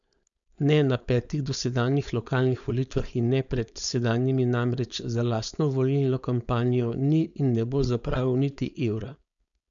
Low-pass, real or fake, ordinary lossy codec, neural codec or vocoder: 7.2 kHz; fake; none; codec, 16 kHz, 4.8 kbps, FACodec